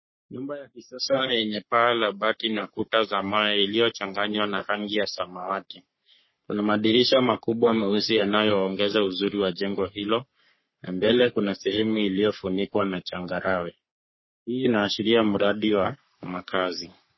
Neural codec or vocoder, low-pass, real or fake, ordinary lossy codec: codec, 44.1 kHz, 3.4 kbps, Pupu-Codec; 7.2 kHz; fake; MP3, 24 kbps